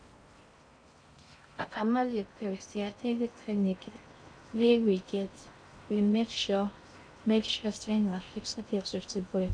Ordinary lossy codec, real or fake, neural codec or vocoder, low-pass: none; fake; codec, 16 kHz in and 24 kHz out, 0.6 kbps, FocalCodec, streaming, 4096 codes; 9.9 kHz